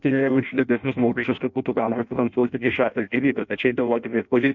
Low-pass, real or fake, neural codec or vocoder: 7.2 kHz; fake; codec, 16 kHz in and 24 kHz out, 0.6 kbps, FireRedTTS-2 codec